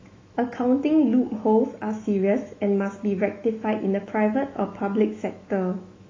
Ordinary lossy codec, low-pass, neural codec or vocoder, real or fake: AAC, 32 kbps; 7.2 kHz; autoencoder, 48 kHz, 128 numbers a frame, DAC-VAE, trained on Japanese speech; fake